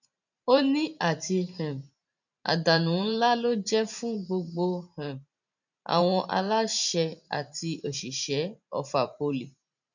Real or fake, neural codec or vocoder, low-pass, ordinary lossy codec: fake; vocoder, 44.1 kHz, 128 mel bands every 512 samples, BigVGAN v2; 7.2 kHz; none